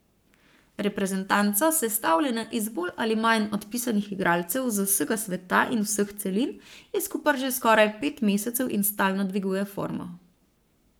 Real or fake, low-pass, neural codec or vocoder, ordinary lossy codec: fake; none; codec, 44.1 kHz, 7.8 kbps, Pupu-Codec; none